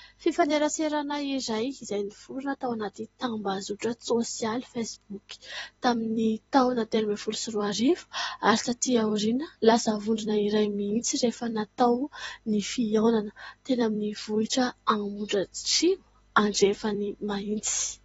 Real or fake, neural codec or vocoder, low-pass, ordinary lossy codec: fake; vocoder, 44.1 kHz, 128 mel bands every 256 samples, BigVGAN v2; 19.8 kHz; AAC, 24 kbps